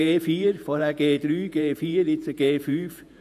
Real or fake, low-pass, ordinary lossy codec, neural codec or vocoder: fake; 14.4 kHz; none; vocoder, 48 kHz, 128 mel bands, Vocos